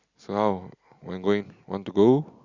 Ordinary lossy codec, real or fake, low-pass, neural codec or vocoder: Opus, 64 kbps; real; 7.2 kHz; none